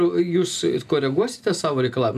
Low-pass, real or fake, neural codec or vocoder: 14.4 kHz; real; none